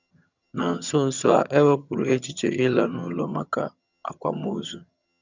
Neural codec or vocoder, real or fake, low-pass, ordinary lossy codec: vocoder, 22.05 kHz, 80 mel bands, HiFi-GAN; fake; 7.2 kHz; none